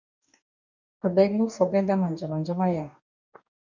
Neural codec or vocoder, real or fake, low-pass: codec, 44.1 kHz, 2.6 kbps, DAC; fake; 7.2 kHz